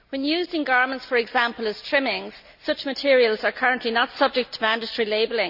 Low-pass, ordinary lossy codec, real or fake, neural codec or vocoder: 5.4 kHz; none; real; none